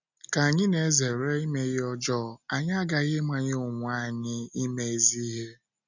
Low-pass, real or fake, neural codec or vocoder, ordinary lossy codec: 7.2 kHz; real; none; none